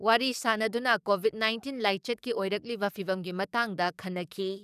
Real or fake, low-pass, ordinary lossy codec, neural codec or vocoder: fake; 14.4 kHz; none; codec, 44.1 kHz, 7.8 kbps, DAC